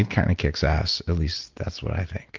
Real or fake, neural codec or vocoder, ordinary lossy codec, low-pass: real; none; Opus, 24 kbps; 7.2 kHz